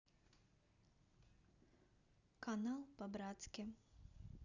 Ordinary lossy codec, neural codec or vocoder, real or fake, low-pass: none; none; real; 7.2 kHz